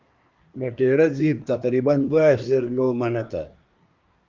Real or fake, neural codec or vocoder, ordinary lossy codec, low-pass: fake; codec, 24 kHz, 1 kbps, SNAC; Opus, 24 kbps; 7.2 kHz